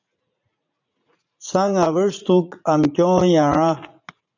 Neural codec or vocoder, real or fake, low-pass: vocoder, 44.1 kHz, 80 mel bands, Vocos; fake; 7.2 kHz